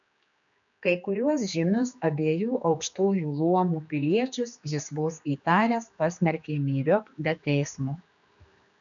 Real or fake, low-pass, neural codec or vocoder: fake; 7.2 kHz; codec, 16 kHz, 2 kbps, X-Codec, HuBERT features, trained on general audio